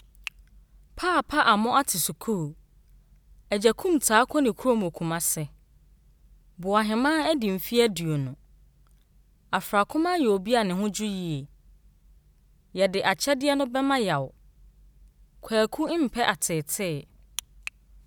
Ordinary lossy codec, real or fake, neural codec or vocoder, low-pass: none; real; none; none